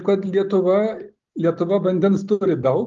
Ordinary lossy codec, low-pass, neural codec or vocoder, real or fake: Opus, 32 kbps; 7.2 kHz; none; real